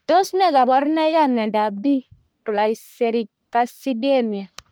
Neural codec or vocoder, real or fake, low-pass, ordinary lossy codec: codec, 44.1 kHz, 1.7 kbps, Pupu-Codec; fake; none; none